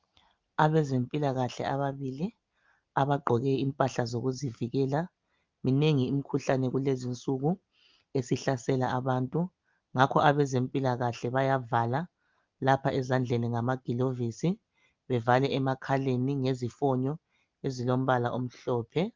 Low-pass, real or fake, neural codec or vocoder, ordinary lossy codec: 7.2 kHz; real; none; Opus, 24 kbps